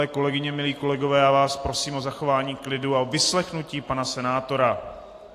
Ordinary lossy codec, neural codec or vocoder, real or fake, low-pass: AAC, 48 kbps; none; real; 14.4 kHz